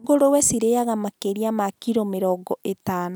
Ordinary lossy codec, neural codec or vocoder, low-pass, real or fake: none; none; none; real